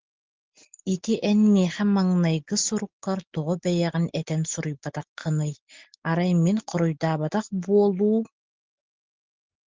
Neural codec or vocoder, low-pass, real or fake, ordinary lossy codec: none; 7.2 kHz; real; Opus, 16 kbps